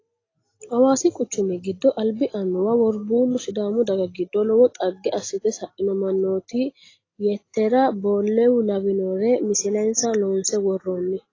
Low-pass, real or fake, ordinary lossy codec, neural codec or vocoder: 7.2 kHz; real; AAC, 32 kbps; none